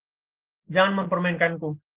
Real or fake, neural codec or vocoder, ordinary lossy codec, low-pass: real; none; Opus, 16 kbps; 3.6 kHz